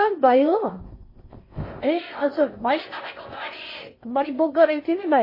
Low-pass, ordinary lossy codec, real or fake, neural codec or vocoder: 5.4 kHz; MP3, 24 kbps; fake; codec, 16 kHz in and 24 kHz out, 0.6 kbps, FocalCodec, streaming, 2048 codes